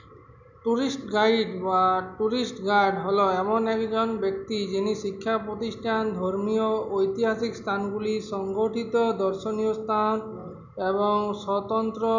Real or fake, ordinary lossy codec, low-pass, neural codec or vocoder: real; none; 7.2 kHz; none